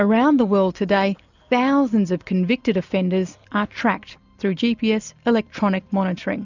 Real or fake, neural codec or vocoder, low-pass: real; none; 7.2 kHz